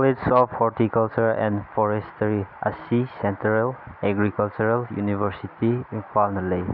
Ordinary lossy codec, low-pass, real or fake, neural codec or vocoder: none; 5.4 kHz; real; none